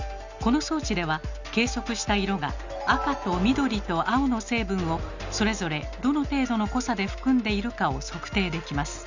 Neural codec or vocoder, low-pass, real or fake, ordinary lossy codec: none; 7.2 kHz; real; Opus, 64 kbps